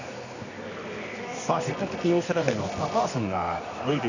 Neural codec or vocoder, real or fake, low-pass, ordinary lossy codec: codec, 24 kHz, 0.9 kbps, WavTokenizer, medium music audio release; fake; 7.2 kHz; none